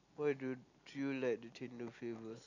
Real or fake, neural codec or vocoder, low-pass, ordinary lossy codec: real; none; 7.2 kHz; none